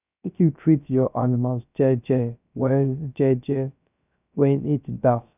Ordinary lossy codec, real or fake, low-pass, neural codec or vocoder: none; fake; 3.6 kHz; codec, 16 kHz, 0.3 kbps, FocalCodec